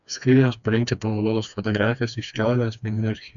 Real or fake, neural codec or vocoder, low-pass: fake; codec, 16 kHz, 2 kbps, FreqCodec, smaller model; 7.2 kHz